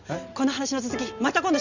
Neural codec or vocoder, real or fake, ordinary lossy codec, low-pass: none; real; Opus, 64 kbps; 7.2 kHz